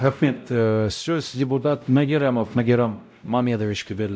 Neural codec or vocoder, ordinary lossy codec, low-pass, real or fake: codec, 16 kHz, 0.5 kbps, X-Codec, WavLM features, trained on Multilingual LibriSpeech; none; none; fake